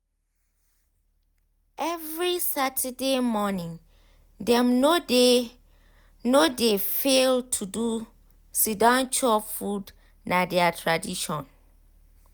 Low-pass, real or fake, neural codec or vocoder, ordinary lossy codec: none; real; none; none